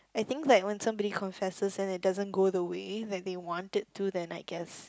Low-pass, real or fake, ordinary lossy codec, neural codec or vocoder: none; real; none; none